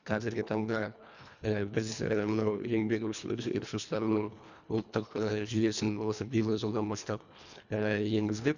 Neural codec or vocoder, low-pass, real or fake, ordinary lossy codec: codec, 24 kHz, 1.5 kbps, HILCodec; 7.2 kHz; fake; none